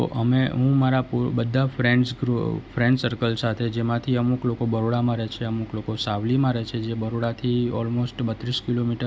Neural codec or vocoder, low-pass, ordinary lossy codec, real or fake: none; none; none; real